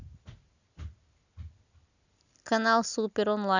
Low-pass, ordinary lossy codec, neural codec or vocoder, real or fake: 7.2 kHz; none; none; real